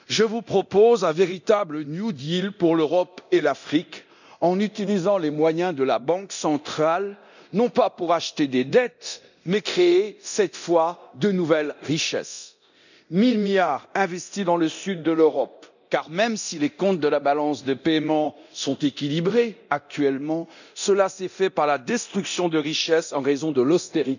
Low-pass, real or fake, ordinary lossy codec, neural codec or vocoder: 7.2 kHz; fake; none; codec, 24 kHz, 0.9 kbps, DualCodec